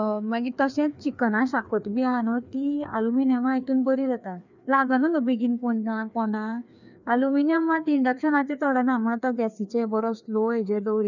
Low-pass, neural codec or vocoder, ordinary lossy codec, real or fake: 7.2 kHz; codec, 16 kHz, 2 kbps, FreqCodec, larger model; none; fake